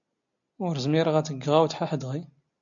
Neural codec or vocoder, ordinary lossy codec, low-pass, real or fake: none; MP3, 64 kbps; 7.2 kHz; real